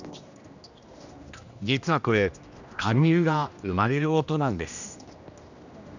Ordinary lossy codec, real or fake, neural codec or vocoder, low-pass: none; fake; codec, 16 kHz, 1 kbps, X-Codec, HuBERT features, trained on general audio; 7.2 kHz